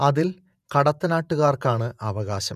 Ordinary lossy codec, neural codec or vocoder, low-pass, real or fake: none; vocoder, 48 kHz, 128 mel bands, Vocos; 14.4 kHz; fake